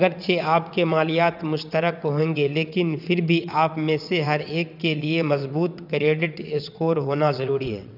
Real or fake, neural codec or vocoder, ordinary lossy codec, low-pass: fake; vocoder, 22.05 kHz, 80 mel bands, WaveNeXt; none; 5.4 kHz